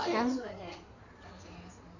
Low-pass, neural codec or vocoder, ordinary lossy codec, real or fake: 7.2 kHz; codec, 16 kHz in and 24 kHz out, 2.2 kbps, FireRedTTS-2 codec; none; fake